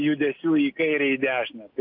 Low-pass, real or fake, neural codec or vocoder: 5.4 kHz; real; none